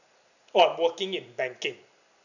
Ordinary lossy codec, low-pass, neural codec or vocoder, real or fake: none; 7.2 kHz; none; real